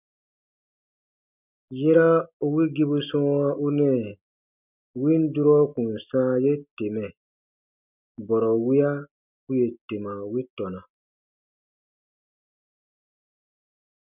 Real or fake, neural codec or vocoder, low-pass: real; none; 3.6 kHz